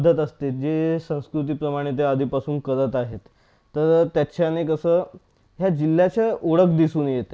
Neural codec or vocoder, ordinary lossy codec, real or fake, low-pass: none; none; real; none